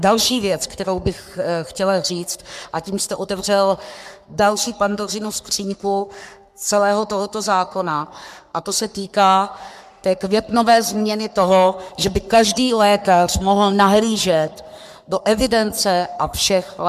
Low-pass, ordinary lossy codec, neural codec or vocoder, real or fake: 14.4 kHz; MP3, 96 kbps; codec, 44.1 kHz, 3.4 kbps, Pupu-Codec; fake